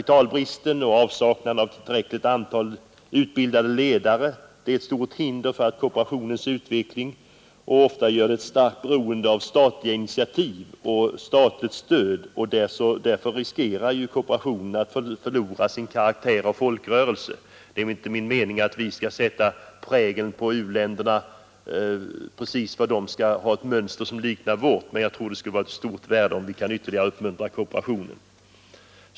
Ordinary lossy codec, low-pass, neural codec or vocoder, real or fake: none; none; none; real